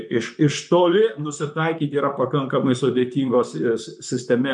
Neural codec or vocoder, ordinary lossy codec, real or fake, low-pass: vocoder, 44.1 kHz, 128 mel bands, Pupu-Vocoder; MP3, 96 kbps; fake; 10.8 kHz